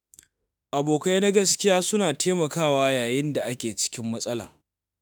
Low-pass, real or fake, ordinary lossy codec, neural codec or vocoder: none; fake; none; autoencoder, 48 kHz, 32 numbers a frame, DAC-VAE, trained on Japanese speech